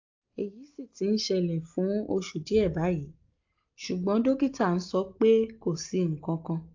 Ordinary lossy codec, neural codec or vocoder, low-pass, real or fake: AAC, 48 kbps; none; 7.2 kHz; real